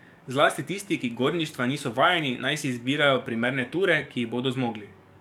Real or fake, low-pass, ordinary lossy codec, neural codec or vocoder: fake; 19.8 kHz; none; codec, 44.1 kHz, 7.8 kbps, DAC